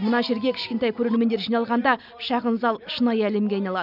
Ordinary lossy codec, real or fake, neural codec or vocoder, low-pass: none; real; none; 5.4 kHz